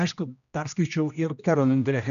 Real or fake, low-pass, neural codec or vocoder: fake; 7.2 kHz; codec, 16 kHz, 1 kbps, X-Codec, HuBERT features, trained on general audio